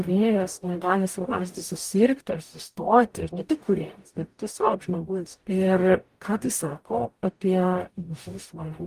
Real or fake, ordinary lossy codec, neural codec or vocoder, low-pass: fake; Opus, 32 kbps; codec, 44.1 kHz, 0.9 kbps, DAC; 14.4 kHz